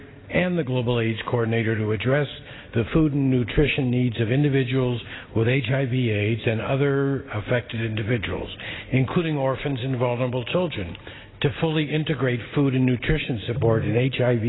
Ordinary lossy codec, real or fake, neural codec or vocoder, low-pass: AAC, 16 kbps; real; none; 7.2 kHz